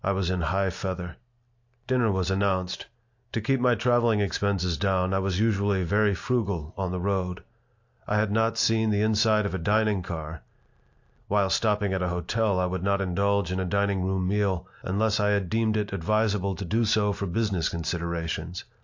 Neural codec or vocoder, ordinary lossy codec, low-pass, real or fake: none; AAC, 48 kbps; 7.2 kHz; real